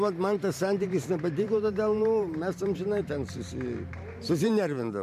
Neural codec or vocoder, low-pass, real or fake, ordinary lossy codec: vocoder, 44.1 kHz, 128 mel bands every 512 samples, BigVGAN v2; 14.4 kHz; fake; MP3, 64 kbps